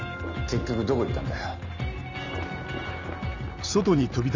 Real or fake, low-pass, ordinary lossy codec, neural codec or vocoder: real; 7.2 kHz; none; none